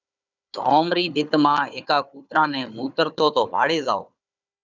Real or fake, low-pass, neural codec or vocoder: fake; 7.2 kHz; codec, 16 kHz, 4 kbps, FunCodec, trained on Chinese and English, 50 frames a second